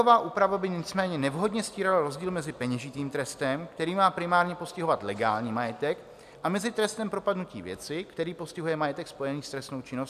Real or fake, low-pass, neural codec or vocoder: real; 14.4 kHz; none